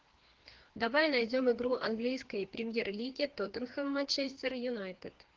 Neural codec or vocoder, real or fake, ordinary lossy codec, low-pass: codec, 16 kHz, 2 kbps, FreqCodec, larger model; fake; Opus, 16 kbps; 7.2 kHz